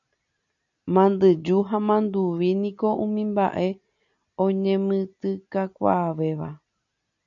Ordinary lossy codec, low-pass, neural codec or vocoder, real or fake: MP3, 96 kbps; 7.2 kHz; none; real